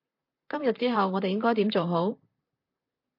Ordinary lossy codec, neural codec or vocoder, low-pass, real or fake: MP3, 32 kbps; vocoder, 44.1 kHz, 128 mel bands, Pupu-Vocoder; 5.4 kHz; fake